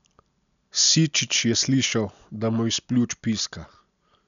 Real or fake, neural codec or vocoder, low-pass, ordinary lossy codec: real; none; 7.2 kHz; none